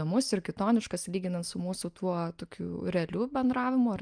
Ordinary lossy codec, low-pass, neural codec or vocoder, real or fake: Opus, 32 kbps; 9.9 kHz; none; real